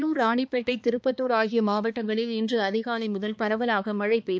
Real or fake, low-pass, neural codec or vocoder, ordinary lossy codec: fake; none; codec, 16 kHz, 2 kbps, X-Codec, HuBERT features, trained on balanced general audio; none